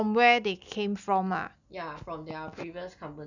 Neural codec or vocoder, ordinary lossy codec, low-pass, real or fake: none; none; 7.2 kHz; real